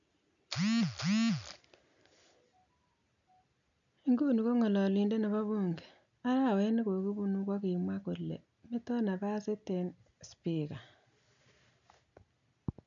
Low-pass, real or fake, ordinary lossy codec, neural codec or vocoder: 7.2 kHz; real; none; none